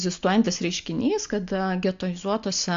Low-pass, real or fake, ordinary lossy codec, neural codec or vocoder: 7.2 kHz; real; AAC, 64 kbps; none